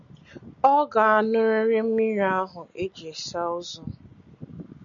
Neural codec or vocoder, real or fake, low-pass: none; real; 7.2 kHz